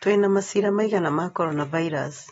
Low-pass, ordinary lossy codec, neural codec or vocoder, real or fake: 19.8 kHz; AAC, 24 kbps; none; real